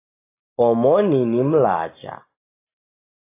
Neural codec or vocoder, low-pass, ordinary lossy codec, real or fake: none; 3.6 kHz; AAC, 24 kbps; real